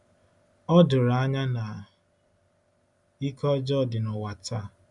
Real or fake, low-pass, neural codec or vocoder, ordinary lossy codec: real; 10.8 kHz; none; none